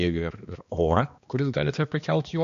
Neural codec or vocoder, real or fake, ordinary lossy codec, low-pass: codec, 16 kHz, 2 kbps, X-Codec, HuBERT features, trained on balanced general audio; fake; MP3, 48 kbps; 7.2 kHz